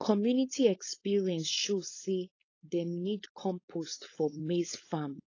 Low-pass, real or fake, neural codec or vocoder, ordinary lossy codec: 7.2 kHz; fake; codec, 16 kHz, 4.8 kbps, FACodec; AAC, 32 kbps